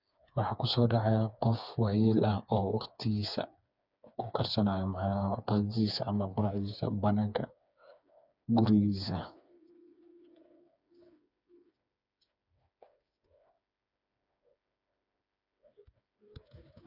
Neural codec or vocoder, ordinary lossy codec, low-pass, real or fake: codec, 16 kHz, 4 kbps, FreqCodec, smaller model; none; 5.4 kHz; fake